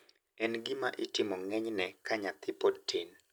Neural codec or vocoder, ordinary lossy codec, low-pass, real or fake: none; none; none; real